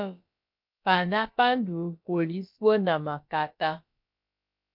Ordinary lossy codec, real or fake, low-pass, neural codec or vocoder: MP3, 48 kbps; fake; 5.4 kHz; codec, 16 kHz, about 1 kbps, DyCAST, with the encoder's durations